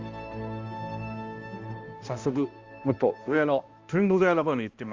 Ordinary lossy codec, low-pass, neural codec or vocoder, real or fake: Opus, 32 kbps; 7.2 kHz; codec, 16 kHz, 1 kbps, X-Codec, HuBERT features, trained on balanced general audio; fake